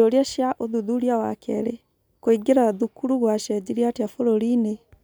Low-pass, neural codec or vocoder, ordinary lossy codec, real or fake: none; none; none; real